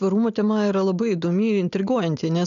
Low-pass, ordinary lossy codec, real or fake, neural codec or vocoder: 7.2 kHz; MP3, 96 kbps; real; none